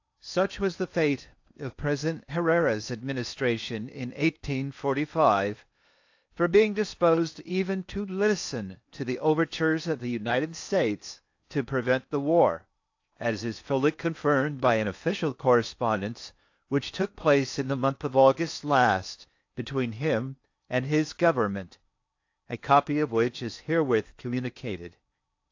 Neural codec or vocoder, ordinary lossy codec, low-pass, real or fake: codec, 16 kHz in and 24 kHz out, 0.8 kbps, FocalCodec, streaming, 65536 codes; AAC, 48 kbps; 7.2 kHz; fake